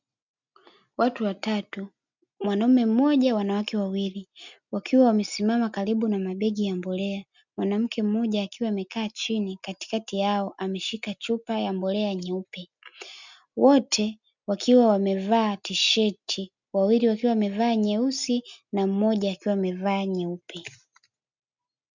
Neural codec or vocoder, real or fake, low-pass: none; real; 7.2 kHz